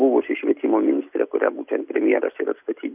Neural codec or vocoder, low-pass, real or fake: none; 3.6 kHz; real